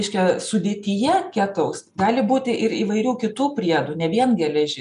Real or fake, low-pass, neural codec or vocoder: real; 10.8 kHz; none